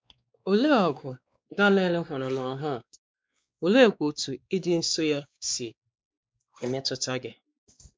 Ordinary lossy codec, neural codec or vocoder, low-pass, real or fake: none; codec, 16 kHz, 2 kbps, X-Codec, WavLM features, trained on Multilingual LibriSpeech; none; fake